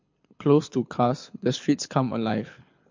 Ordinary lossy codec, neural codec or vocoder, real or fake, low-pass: MP3, 48 kbps; codec, 24 kHz, 6 kbps, HILCodec; fake; 7.2 kHz